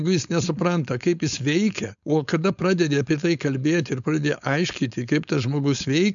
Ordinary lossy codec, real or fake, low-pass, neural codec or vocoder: MP3, 96 kbps; fake; 7.2 kHz; codec, 16 kHz, 4.8 kbps, FACodec